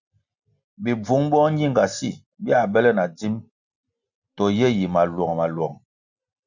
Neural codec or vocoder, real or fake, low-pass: none; real; 7.2 kHz